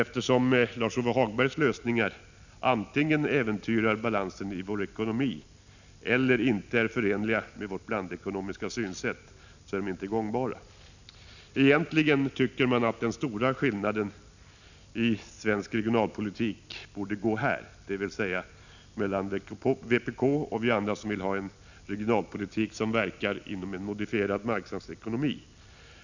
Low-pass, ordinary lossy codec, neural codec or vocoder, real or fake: 7.2 kHz; none; none; real